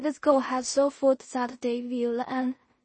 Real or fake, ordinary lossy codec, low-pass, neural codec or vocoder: fake; MP3, 32 kbps; 9.9 kHz; codec, 16 kHz in and 24 kHz out, 0.4 kbps, LongCat-Audio-Codec, two codebook decoder